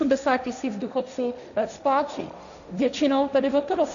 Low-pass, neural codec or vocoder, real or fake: 7.2 kHz; codec, 16 kHz, 1.1 kbps, Voila-Tokenizer; fake